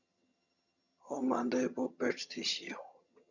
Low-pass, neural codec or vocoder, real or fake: 7.2 kHz; vocoder, 22.05 kHz, 80 mel bands, HiFi-GAN; fake